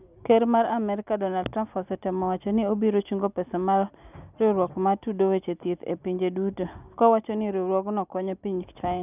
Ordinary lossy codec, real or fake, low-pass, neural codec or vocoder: none; real; 3.6 kHz; none